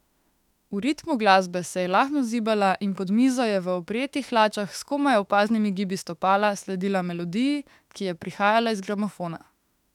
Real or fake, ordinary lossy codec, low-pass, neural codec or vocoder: fake; none; 19.8 kHz; autoencoder, 48 kHz, 32 numbers a frame, DAC-VAE, trained on Japanese speech